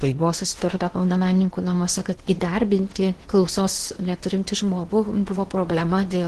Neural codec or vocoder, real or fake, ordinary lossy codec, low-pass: codec, 16 kHz in and 24 kHz out, 0.8 kbps, FocalCodec, streaming, 65536 codes; fake; Opus, 16 kbps; 10.8 kHz